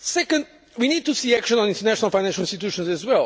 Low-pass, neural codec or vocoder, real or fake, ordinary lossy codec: none; none; real; none